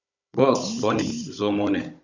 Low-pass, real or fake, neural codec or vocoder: 7.2 kHz; fake; codec, 16 kHz, 16 kbps, FunCodec, trained on Chinese and English, 50 frames a second